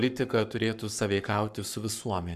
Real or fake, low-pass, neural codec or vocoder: fake; 14.4 kHz; codec, 44.1 kHz, 7.8 kbps, Pupu-Codec